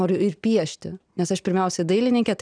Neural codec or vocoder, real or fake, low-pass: none; real; 9.9 kHz